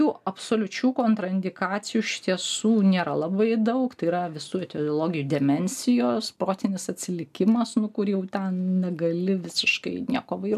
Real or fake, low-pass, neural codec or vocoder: real; 14.4 kHz; none